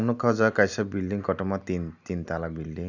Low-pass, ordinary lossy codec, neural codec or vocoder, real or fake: 7.2 kHz; none; none; real